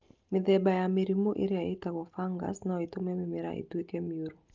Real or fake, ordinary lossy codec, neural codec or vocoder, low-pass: real; Opus, 32 kbps; none; 7.2 kHz